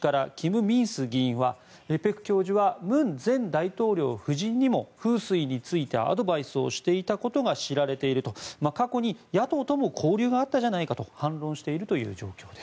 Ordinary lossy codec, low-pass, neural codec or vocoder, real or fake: none; none; none; real